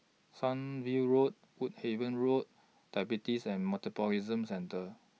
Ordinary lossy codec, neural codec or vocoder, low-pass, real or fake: none; none; none; real